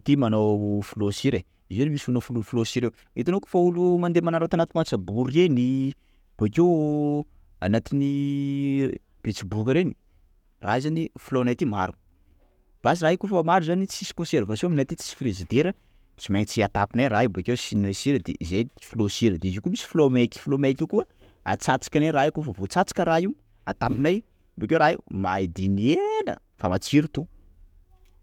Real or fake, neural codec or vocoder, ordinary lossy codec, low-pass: fake; codec, 44.1 kHz, 7.8 kbps, DAC; MP3, 96 kbps; 19.8 kHz